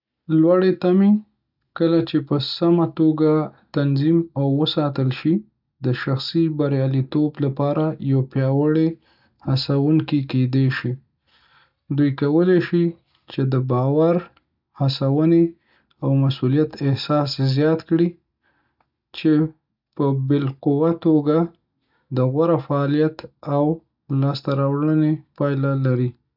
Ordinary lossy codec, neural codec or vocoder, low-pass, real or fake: none; none; 5.4 kHz; real